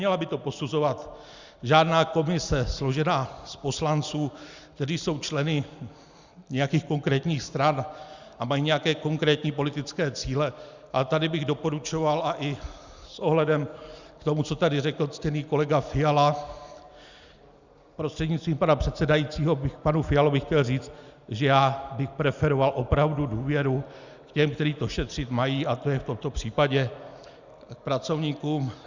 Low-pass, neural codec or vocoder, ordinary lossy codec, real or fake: 7.2 kHz; none; Opus, 64 kbps; real